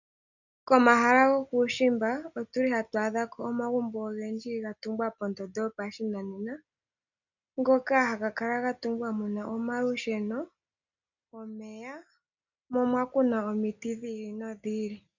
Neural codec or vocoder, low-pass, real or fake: none; 7.2 kHz; real